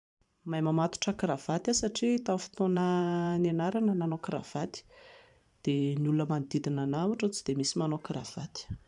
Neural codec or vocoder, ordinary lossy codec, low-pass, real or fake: vocoder, 44.1 kHz, 128 mel bands every 512 samples, BigVGAN v2; none; 10.8 kHz; fake